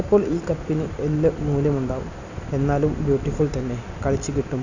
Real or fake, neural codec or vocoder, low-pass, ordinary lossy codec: real; none; 7.2 kHz; none